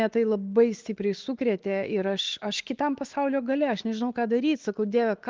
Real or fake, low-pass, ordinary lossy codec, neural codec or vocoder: fake; 7.2 kHz; Opus, 16 kbps; autoencoder, 48 kHz, 128 numbers a frame, DAC-VAE, trained on Japanese speech